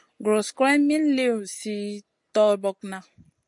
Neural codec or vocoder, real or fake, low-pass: none; real; 10.8 kHz